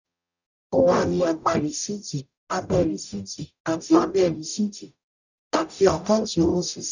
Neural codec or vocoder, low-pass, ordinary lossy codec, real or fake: codec, 44.1 kHz, 0.9 kbps, DAC; 7.2 kHz; none; fake